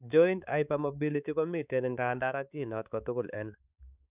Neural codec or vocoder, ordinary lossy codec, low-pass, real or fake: codec, 16 kHz, 4 kbps, X-Codec, HuBERT features, trained on balanced general audio; none; 3.6 kHz; fake